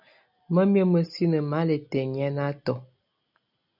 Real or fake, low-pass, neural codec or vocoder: real; 5.4 kHz; none